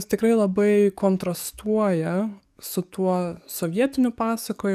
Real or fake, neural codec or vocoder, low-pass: fake; codec, 44.1 kHz, 7.8 kbps, DAC; 14.4 kHz